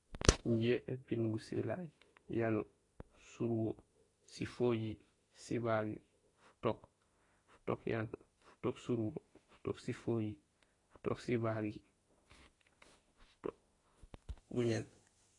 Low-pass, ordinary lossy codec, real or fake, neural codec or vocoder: 10.8 kHz; AAC, 32 kbps; fake; autoencoder, 48 kHz, 32 numbers a frame, DAC-VAE, trained on Japanese speech